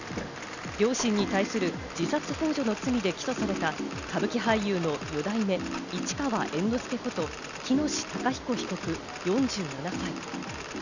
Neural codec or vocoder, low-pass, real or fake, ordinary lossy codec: none; 7.2 kHz; real; none